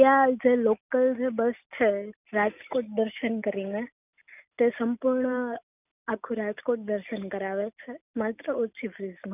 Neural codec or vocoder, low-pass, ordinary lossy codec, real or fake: none; 3.6 kHz; none; real